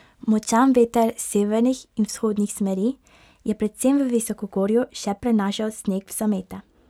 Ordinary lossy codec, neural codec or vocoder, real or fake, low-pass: none; none; real; 19.8 kHz